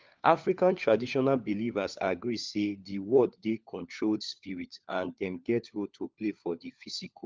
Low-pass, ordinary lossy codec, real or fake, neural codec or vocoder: 7.2 kHz; Opus, 32 kbps; fake; codec, 16 kHz, 4 kbps, FunCodec, trained on LibriTTS, 50 frames a second